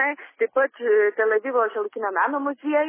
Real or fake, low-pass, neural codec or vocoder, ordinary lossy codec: real; 3.6 kHz; none; MP3, 16 kbps